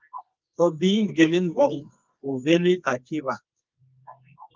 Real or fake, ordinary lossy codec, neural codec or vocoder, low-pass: fake; Opus, 24 kbps; codec, 24 kHz, 0.9 kbps, WavTokenizer, medium music audio release; 7.2 kHz